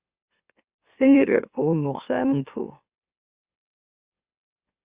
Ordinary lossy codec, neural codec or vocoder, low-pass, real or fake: Opus, 64 kbps; autoencoder, 44.1 kHz, a latent of 192 numbers a frame, MeloTTS; 3.6 kHz; fake